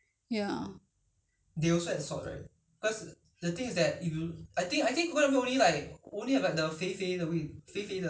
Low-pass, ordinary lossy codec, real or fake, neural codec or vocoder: none; none; real; none